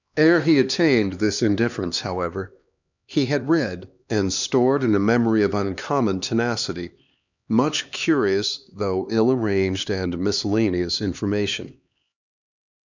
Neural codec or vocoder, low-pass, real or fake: codec, 16 kHz, 2 kbps, X-Codec, HuBERT features, trained on LibriSpeech; 7.2 kHz; fake